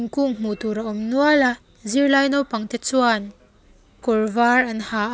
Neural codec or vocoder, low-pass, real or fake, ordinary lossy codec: none; none; real; none